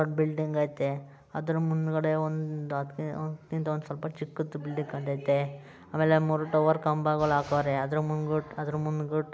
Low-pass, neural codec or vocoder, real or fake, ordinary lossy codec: none; none; real; none